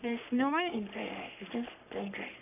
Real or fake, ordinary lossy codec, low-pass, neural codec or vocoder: fake; none; 3.6 kHz; codec, 44.1 kHz, 3.4 kbps, Pupu-Codec